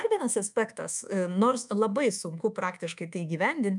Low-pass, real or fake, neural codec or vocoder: 10.8 kHz; fake; codec, 24 kHz, 1.2 kbps, DualCodec